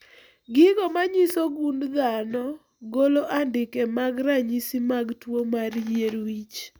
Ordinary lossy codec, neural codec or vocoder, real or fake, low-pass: none; none; real; none